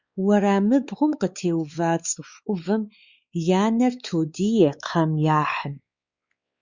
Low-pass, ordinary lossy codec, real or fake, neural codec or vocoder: 7.2 kHz; Opus, 64 kbps; fake; codec, 16 kHz, 4 kbps, X-Codec, WavLM features, trained on Multilingual LibriSpeech